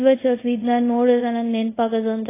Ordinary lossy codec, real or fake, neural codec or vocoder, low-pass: AAC, 16 kbps; fake; codec, 24 kHz, 0.5 kbps, DualCodec; 3.6 kHz